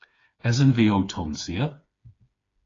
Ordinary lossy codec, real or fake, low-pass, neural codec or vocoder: AAC, 32 kbps; fake; 7.2 kHz; codec, 16 kHz, 4 kbps, FreqCodec, smaller model